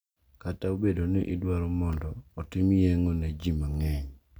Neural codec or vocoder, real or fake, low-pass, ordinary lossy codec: none; real; none; none